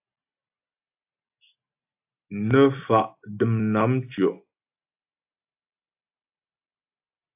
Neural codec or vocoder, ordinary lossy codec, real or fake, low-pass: none; AAC, 32 kbps; real; 3.6 kHz